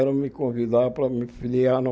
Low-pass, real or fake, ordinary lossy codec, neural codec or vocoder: none; real; none; none